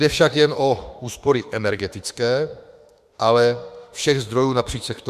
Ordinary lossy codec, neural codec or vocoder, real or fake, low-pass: Opus, 64 kbps; autoencoder, 48 kHz, 32 numbers a frame, DAC-VAE, trained on Japanese speech; fake; 14.4 kHz